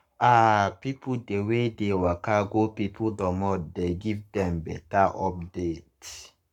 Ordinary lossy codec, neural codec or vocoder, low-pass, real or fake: none; codec, 44.1 kHz, 7.8 kbps, Pupu-Codec; 19.8 kHz; fake